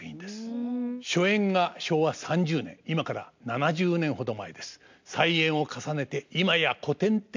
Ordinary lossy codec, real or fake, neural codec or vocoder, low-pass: MP3, 64 kbps; real; none; 7.2 kHz